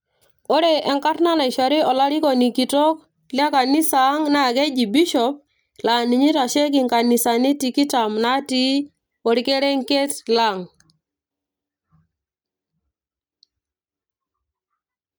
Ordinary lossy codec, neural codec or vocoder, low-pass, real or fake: none; none; none; real